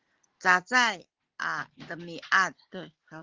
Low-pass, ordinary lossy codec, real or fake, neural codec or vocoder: 7.2 kHz; Opus, 16 kbps; real; none